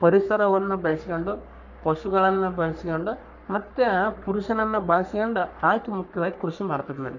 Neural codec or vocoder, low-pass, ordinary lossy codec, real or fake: codec, 44.1 kHz, 3.4 kbps, Pupu-Codec; 7.2 kHz; none; fake